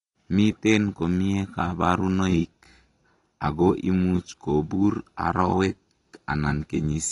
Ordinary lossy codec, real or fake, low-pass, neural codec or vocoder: AAC, 32 kbps; real; 10.8 kHz; none